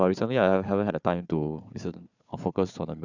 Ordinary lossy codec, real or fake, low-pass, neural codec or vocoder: none; fake; 7.2 kHz; codec, 16 kHz, 4 kbps, FunCodec, trained on Chinese and English, 50 frames a second